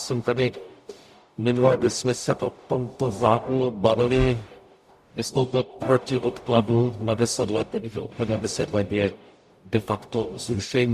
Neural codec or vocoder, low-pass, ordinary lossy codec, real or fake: codec, 44.1 kHz, 0.9 kbps, DAC; 14.4 kHz; Opus, 64 kbps; fake